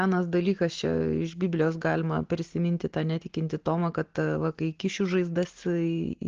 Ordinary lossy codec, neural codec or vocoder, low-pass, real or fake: Opus, 16 kbps; none; 7.2 kHz; real